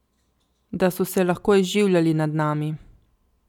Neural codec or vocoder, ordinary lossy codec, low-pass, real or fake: none; none; 19.8 kHz; real